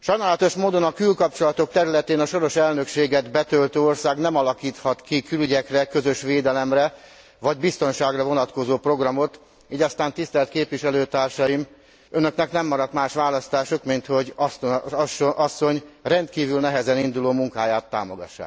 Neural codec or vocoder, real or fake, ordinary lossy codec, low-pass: none; real; none; none